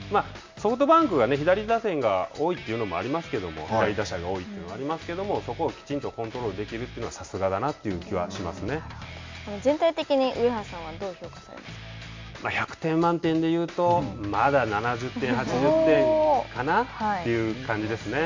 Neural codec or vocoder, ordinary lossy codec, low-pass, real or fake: none; MP3, 48 kbps; 7.2 kHz; real